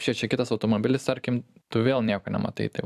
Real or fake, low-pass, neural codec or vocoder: fake; 14.4 kHz; vocoder, 44.1 kHz, 128 mel bands every 256 samples, BigVGAN v2